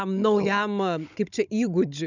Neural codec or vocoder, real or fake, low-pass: codec, 16 kHz, 16 kbps, FunCodec, trained on Chinese and English, 50 frames a second; fake; 7.2 kHz